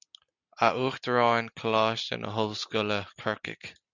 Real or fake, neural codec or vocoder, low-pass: real; none; 7.2 kHz